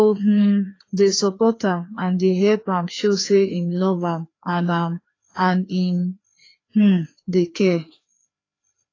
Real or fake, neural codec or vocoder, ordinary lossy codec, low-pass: fake; codec, 16 kHz, 2 kbps, FreqCodec, larger model; AAC, 32 kbps; 7.2 kHz